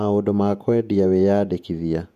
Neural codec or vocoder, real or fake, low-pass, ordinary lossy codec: vocoder, 48 kHz, 128 mel bands, Vocos; fake; 14.4 kHz; MP3, 96 kbps